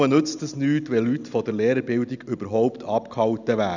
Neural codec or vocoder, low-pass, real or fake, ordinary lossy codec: none; 7.2 kHz; real; none